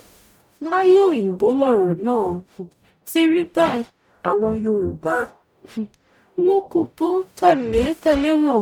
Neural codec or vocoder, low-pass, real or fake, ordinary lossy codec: codec, 44.1 kHz, 0.9 kbps, DAC; 19.8 kHz; fake; none